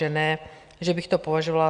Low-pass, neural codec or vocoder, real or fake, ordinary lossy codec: 9.9 kHz; none; real; Opus, 64 kbps